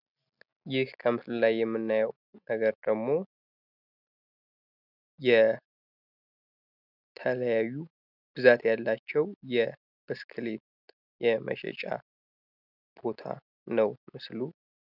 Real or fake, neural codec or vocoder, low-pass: real; none; 5.4 kHz